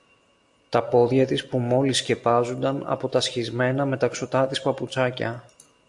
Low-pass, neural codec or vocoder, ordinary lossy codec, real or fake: 10.8 kHz; none; AAC, 64 kbps; real